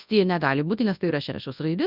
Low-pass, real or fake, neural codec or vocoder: 5.4 kHz; fake; codec, 24 kHz, 0.9 kbps, WavTokenizer, large speech release